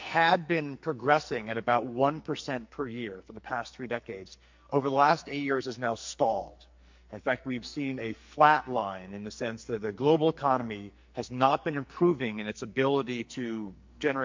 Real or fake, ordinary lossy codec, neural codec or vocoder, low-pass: fake; MP3, 48 kbps; codec, 44.1 kHz, 2.6 kbps, SNAC; 7.2 kHz